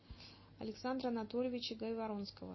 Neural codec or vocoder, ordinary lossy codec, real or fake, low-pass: none; MP3, 24 kbps; real; 7.2 kHz